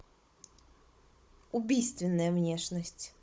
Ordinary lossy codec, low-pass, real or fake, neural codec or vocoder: none; none; fake; codec, 16 kHz, 16 kbps, FunCodec, trained on Chinese and English, 50 frames a second